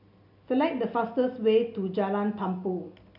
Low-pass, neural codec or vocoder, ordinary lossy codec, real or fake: 5.4 kHz; none; none; real